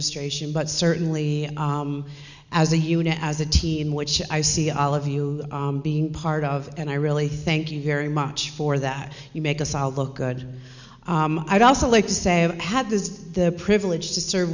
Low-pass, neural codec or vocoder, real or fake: 7.2 kHz; none; real